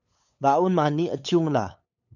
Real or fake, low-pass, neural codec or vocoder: fake; 7.2 kHz; codec, 16 kHz, 8 kbps, FunCodec, trained on LibriTTS, 25 frames a second